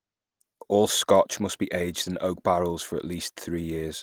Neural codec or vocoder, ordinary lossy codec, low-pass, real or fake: none; Opus, 24 kbps; 19.8 kHz; real